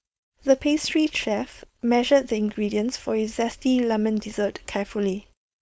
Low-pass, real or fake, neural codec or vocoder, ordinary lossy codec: none; fake; codec, 16 kHz, 4.8 kbps, FACodec; none